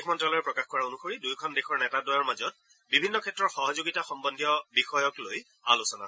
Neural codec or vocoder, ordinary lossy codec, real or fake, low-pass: none; none; real; none